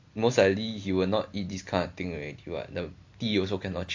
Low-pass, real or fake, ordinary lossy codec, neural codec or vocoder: 7.2 kHz; real; AAC, 48 kbps; none